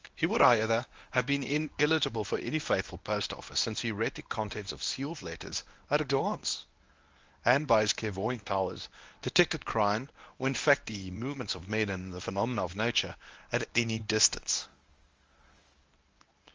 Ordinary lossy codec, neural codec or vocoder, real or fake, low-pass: Opus, 32 kbps; codec, 24 kHz, 0.9 kbps, WavTokenizer, medium speech release version 1; fake; 7.2 kHz